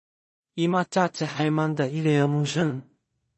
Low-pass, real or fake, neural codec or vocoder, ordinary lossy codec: 10.8 kHz; fake; codec, 16 kHz in and 24 kHz out, 0.4 kbps, LongCat-Audio-Codec, two codebook decoder; MP3, 32 kbps